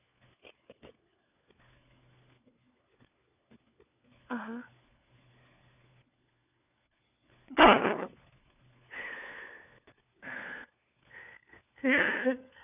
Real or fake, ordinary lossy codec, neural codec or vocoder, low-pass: fake; none; codec, 16 kHz, 4 kbps, FunCodec, trained on LibriTTS, 50 frames a second; 3.6 kHz